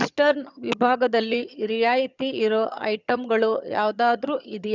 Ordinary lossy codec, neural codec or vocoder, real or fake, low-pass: none; vocoder, 22.05 kHz, 80 mel bands, HiFi-GAN; fake; 7.2 kHz